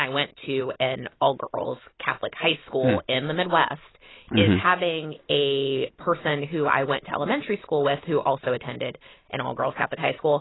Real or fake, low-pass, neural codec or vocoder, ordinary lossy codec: real; 7.2 kHz; none; AAC, 16 kbps